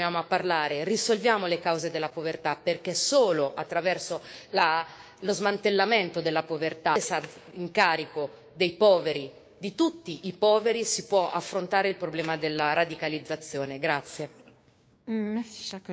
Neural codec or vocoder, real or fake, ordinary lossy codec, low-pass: codec, 16 kHz, 6 kbps, DAC; fake; none; none